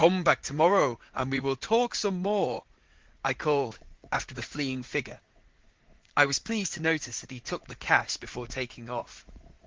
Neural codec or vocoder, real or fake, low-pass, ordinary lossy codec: vocoder, 44.1 kHz, 80 mel bands, Vocos; fake; 7.2 kHz; Opus, 16 kbps